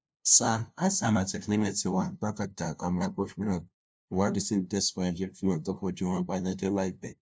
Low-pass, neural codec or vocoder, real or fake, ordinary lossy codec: none; codec, 16 kHz, 0.5 kbps, FunCodec, trained on LibriTTS, 25 frames a second; fake; none